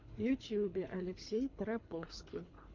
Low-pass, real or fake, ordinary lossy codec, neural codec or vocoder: 7.2 kHz; fake; MP3, 48 kbps; codec, 24 kHz, 3 kbps, HILCodec